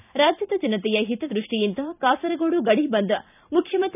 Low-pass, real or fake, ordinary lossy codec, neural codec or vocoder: 3.6 kHz; fake; none; vocoder, 44.1 kHz, 128 mel bands every 512 samples, BigVGAN v2